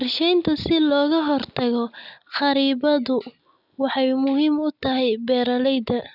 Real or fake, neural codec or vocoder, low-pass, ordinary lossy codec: real; none; 5.4 kHz; none